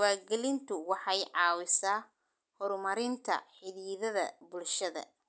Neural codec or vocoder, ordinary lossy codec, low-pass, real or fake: none; none; none; real